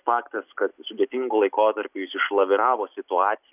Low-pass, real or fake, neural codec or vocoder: 3.6 kHz; real; none